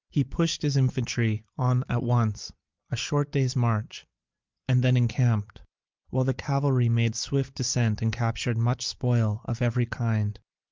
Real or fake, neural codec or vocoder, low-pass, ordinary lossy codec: real; none; 7.2 kHz; Opus, 24 kbps